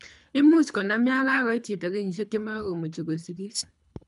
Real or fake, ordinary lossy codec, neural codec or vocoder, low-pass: fake; none; codec, 24 kHz, 3 kbps, HILCodec; 10.8 kHz